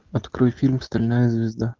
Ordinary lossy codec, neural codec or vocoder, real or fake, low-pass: Opus, 32 kbps; none; real; 7.2 kHz